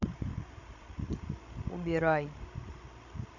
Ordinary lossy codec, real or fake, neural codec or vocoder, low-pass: none; fake; codec, 16 kHz, 16 kbps, FreqCodec, larger model; 7.2 kHz